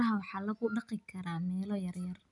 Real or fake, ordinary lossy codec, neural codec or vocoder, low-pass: real; none; none; 10.8 kHz